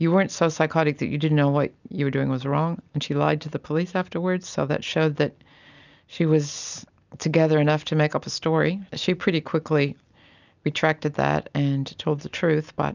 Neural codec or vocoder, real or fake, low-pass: none; real; 7.2 kHz